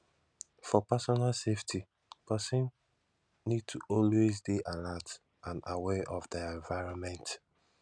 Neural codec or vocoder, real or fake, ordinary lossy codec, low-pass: none; real; none; 9.9 kHz